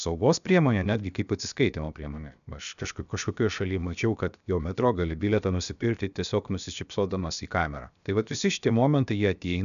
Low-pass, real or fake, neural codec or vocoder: 7.2 kHz; fake; codec, 16 kHz, 0.7 kbps, FocalCodec